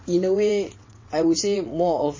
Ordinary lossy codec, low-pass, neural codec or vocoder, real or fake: MP3, 32 kbps; 7.2 kHz; vocoder, 22.05 kHz, 80 mel bands, WaveNeXt; fake